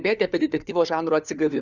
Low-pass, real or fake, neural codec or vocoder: 7.2 kHz; fake; codec, 16 kHz, 4 kbps, FunCodec, trained on LibriTTS, 50 frames a second